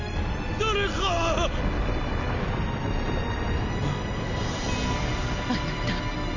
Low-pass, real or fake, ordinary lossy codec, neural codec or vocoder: 7.2 kHz; real; none; none